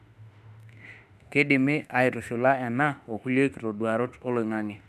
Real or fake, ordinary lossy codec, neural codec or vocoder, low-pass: fake; none; autoencoder, 48 kHz, 32 numbers a frame, DAC-VAE, trained on Japanese speech; 14.4 kHz